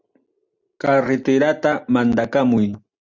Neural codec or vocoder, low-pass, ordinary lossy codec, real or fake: none; 7.2 kHz; Opus, 64 kbps; real